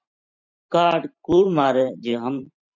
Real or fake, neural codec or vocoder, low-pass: fake; vocoder, 44.1 kHz, 128 mel bands every 512 samples, BigVGAN v2; 7.2 kHz